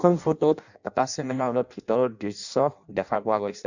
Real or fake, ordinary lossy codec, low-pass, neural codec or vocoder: fake; none; 7.2 kHz; codec, 16 kHz in and 24 kHz out, 0.6 kbps, FireRedTTS-2 codec